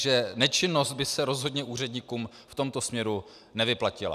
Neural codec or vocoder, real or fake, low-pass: none; real; 14.4 kHz